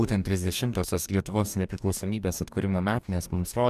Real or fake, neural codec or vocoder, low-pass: fake; codec, 44.1 kHz, 2.6 kbps, DAC; 14.4 kHz